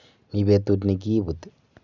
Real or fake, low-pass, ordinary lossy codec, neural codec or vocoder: real; 7.2 kHz; none; none